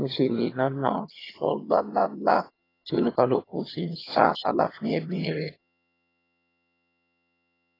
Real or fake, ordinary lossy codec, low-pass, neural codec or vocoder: fake; AAC, 24 kbps; 5.4 kHz; vocoder, 22.05 kHz, 80 mel bands, HiFi-GAN